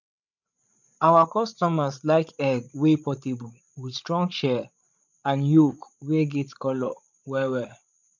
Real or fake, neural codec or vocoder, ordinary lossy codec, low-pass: fake; codec, 16 kHz, 8 kbps, FreqCodec, larger model; none; 7.2 kHz